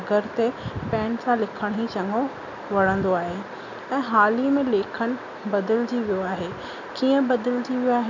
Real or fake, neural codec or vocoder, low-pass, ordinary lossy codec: real; none; 7.2 kHz; none